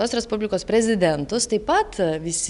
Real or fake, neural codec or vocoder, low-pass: real; none; 10.8 kHz